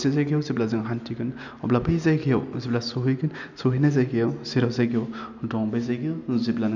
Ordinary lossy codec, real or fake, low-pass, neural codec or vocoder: none; real; 7.2 kHz; none